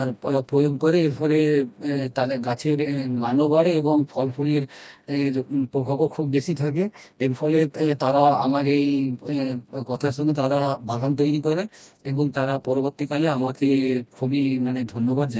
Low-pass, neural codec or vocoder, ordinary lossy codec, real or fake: none; codec, 16 kHz, 1 kbps, FreqCodec, smaller model; none; fake